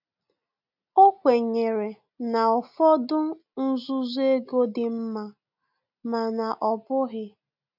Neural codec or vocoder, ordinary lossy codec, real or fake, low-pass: none; none; real; 5.4 kHz